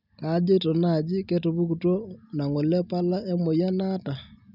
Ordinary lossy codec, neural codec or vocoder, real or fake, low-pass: none; none; real; 5.4 kHz